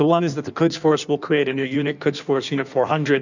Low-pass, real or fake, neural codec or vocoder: 7.2 kHz; fake; codec, 16 kHz in and 24 kHz out, 1.1 kbps, FireRedTTS-2 codec